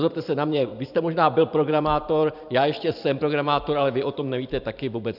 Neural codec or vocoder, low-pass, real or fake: vocoder, 44.1 kHz, 128 mel bands every 512 samples, BigVGAN v2; 5.4 kHz; fake